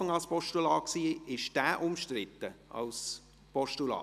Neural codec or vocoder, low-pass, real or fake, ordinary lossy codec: none; 14.4 kHz; real; none